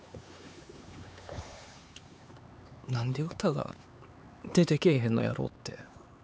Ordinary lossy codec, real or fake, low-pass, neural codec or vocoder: none; fake; none; codec, 16 kHz, 4 kbps, X-Codec, HuBERT features, trained on LibriSpeech